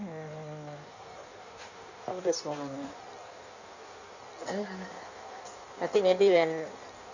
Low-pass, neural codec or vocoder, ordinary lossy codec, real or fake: 7.2 kHz; codec, 16 kHz in and 24 kHz out, 1.1 kbps, FireRedTTS-2 codec; none; fake